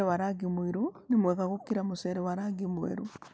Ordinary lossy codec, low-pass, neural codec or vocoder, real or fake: none; none; none; real